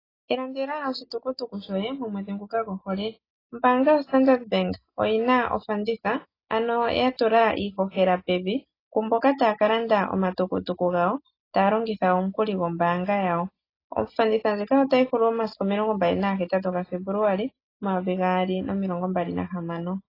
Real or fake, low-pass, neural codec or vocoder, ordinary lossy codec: real; 5.4 kHz; none; AAC, 24 kbps